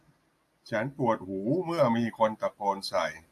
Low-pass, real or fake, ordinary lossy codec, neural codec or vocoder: 14.4 kHz; real; AAC, 64 kbps; none